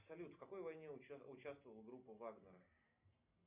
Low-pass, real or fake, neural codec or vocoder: 3.6 kHz; real; none